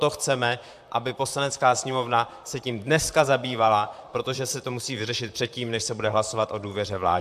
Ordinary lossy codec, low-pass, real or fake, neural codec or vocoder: AAC, 96 kbps; 14.4 kHz; fake; vocoder, 44.1 kHz, 128 mel bands, Pupu-Vocoder